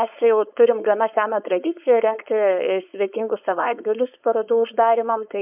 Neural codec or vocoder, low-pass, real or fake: codec, 16 kHz, 4.8 kbps, FACodec; 3.6 kHz; fake